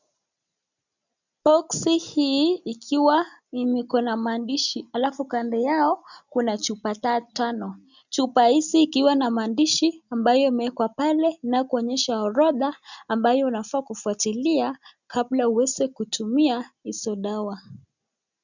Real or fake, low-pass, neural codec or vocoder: real; 7.2 kHz; none